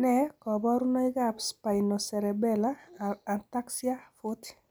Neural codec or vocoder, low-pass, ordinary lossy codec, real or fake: none; none; none; real